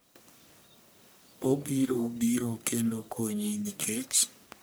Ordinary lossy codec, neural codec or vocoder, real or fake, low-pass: none; codec, 44.1 kHz, 1.7 kbps, Pupu-Codec; fake; none